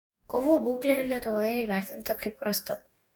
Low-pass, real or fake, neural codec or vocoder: 19.8 kHz; fake; codec, 44.1 kHz, 2.6 kbps, DAC